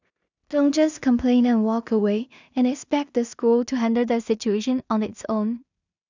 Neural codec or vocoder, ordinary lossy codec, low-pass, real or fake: codec, 16 kHz in and 24 kHz out, 0.4 kbps, LongCat-Audio-Codec, two codebook decoder; none; 7.2 kHz; fake